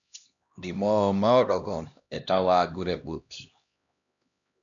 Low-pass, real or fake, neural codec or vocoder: 7.2 kHz; fake; codec, 16 kHz, 1 kbps, X-Codec, HuBERT features, trained on LibriSpeech